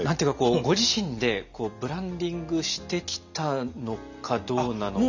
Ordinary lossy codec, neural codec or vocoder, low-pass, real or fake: none; none; 7.2 kHz; real